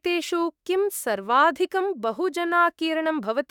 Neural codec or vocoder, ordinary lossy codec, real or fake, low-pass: autoencoder, 48 kHz, 32 numbers a frame, DAC-VAE, trained on Japanese speech; none; fake; 19.8 kHz